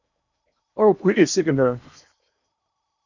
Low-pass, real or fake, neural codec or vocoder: 7.2 kHz; fake; codec, 16 kHz in and 24 kHz out, 0.8 kbps, FocalCodec, streaming, 65536 codes